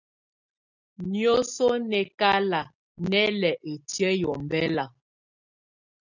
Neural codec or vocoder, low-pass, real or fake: none; 7.2 kHz; real